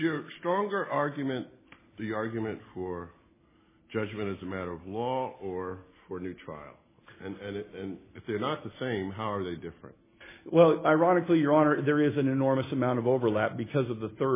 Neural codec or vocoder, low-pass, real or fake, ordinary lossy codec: none; 3.6 kHz; real; MP3, 16 kbps